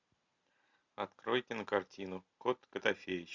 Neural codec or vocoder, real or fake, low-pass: none; real; 7.2 kHz